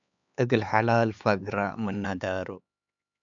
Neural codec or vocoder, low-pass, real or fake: codec, 16 kHz, 4 kbps, X-Codec, HuBERT features, trained on LibriSpeech; 7.2 kHz; fake